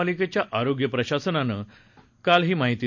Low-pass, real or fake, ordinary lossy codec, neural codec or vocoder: 7.2 kHz; real; none; none